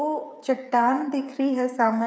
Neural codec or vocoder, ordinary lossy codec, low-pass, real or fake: codec, 16 kHz, 8 kbps, FreqCodec, smaller model; none; none; fake